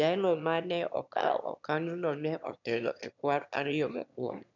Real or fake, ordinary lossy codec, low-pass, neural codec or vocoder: fake; none; 7.2 kHz; autoencoder, 22.05 kHz, a latent of 192 numbers a frame, VITS, trained on one speaker